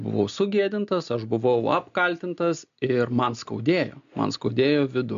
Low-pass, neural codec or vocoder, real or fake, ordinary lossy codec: 7.2 kHz; none; real; MP3, 64 kbps